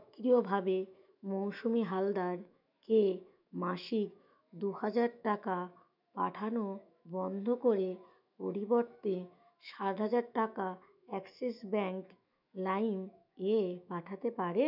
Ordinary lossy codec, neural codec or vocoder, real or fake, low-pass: none; autoencoder, 48 kHz, 128 numbers a frame, DAC-VAE, trained on Japanese speech; fake; 5.4 kHz